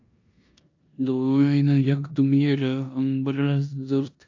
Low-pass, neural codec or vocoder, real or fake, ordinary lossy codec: 7.2 kHz; codec, 16 kHz in and 24 kHz out, 0.9 kbps, LongCat-Audio-Codec, four codebook decoder; fake; AAC, 48 kbps